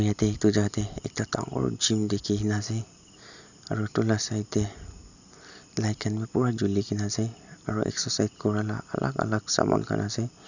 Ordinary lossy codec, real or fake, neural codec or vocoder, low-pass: none; real; none; 7.2 kHz